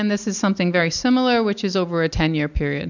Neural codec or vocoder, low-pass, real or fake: none; 7.2 kHz; real